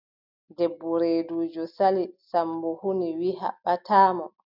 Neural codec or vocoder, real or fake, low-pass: none; real; 5.4 kHz